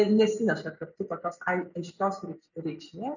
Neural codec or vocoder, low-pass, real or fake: none; 7.2 kHz; real